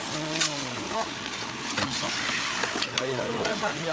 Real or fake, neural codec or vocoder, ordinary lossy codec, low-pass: fake; codec, 16 kHz, 8 kbps, FreqCodec, larger model; none; none